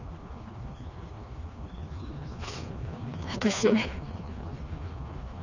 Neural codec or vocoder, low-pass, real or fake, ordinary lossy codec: codec, 16 kHz, 2 kbps, FreqCodec, smaller model; 7.2 kHz; fake; none